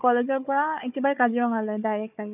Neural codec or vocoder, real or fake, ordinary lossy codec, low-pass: codec, 16 kHz, 4 kbps, FunCodec, trained on Chinese and English, 50 frames a second; fake; none; 3.6 kHz